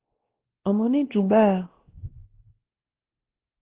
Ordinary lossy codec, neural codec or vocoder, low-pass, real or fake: Opus, 16 kbps; codec, 16 kHz, 1 kbps, X-Codec, WavLM features, trained on Multilingual LibriSpeech; 3.6 kHz; fake